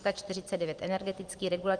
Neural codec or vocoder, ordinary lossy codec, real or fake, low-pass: none; Opus, 24 kbps; real; 9.9 kHz